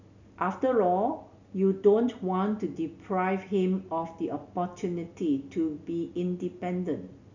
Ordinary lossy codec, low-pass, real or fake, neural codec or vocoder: none; 7.2 kHz; real; none